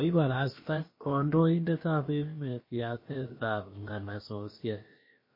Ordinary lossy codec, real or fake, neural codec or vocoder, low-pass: MP3, 24 kbps; fake; codec, 16 kHz, about 1 kbps, DyCAST, with the encoder's durations; 5.4 kHz